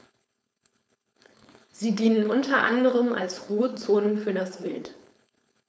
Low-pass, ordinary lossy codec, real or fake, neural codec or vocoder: none; none; fake; codec, 16 kHz, 4.8 kbps, FACodec